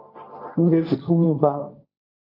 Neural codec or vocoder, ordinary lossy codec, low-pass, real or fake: codec, 16 kHz, 1.1 kbps, Voila-Tokenizer; MP3, 48 kbps; 5.4 kHz; fake